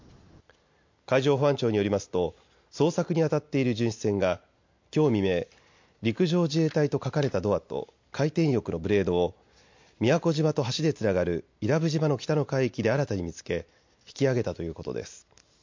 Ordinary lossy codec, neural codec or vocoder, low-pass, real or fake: MP3, 48 kbps; none; 7.2 kHz; real